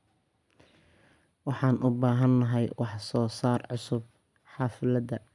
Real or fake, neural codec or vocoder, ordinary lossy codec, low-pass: real; none; none; none